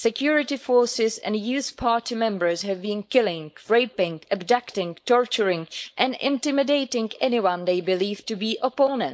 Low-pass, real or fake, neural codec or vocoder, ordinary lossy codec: none; fake; codec, 16 kHz, 4.8 kbps, FACodec; none